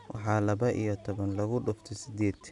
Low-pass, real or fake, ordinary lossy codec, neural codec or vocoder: 10.8 kHz; real; none; none